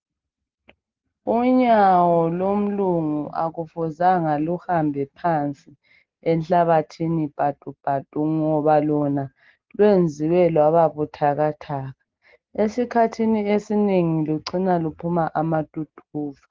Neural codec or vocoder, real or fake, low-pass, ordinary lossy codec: none; real; 7.2 kHz; Opus, 16 kbps